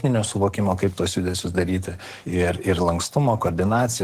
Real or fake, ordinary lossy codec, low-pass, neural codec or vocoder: fake; Opus, 16 kbps; 14.4 kHz; codec, 44.1 kHz, 7.8 kbps, DAC